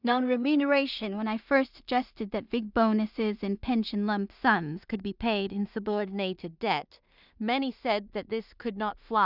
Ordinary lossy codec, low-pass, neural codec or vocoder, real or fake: none; 5.4 kHz; codec, 16 kHz in and 24 kHz out, 0.4 kbps, LongCat-Audio-Codec, two codebook decoder; fake